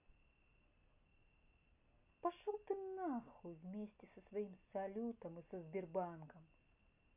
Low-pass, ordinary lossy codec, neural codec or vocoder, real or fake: 3.6 kHz; none; none; real